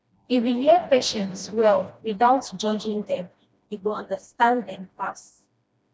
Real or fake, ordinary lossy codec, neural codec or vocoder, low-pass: fake; none; codec, 16 kHz, 1 kbps, FreqCodec, smaller model; none